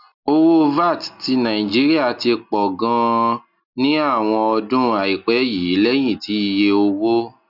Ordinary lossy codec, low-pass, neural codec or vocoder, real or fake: none; 5.4 kHz; none; real